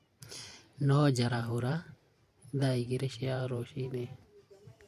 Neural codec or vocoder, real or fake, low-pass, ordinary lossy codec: vocoder, 44.1 kHz, 128 mel bands every 512 samples, BigVGAN v2; fake; 14.4 kHz; MP3, 64 kbps